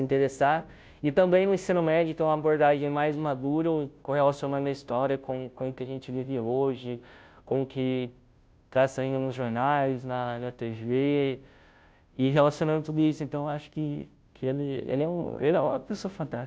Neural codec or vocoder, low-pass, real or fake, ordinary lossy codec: codec, 16 kHz, 0.5 kbps, FunCodec, trained on Chinese and English, 25 frames a second; none; fake; none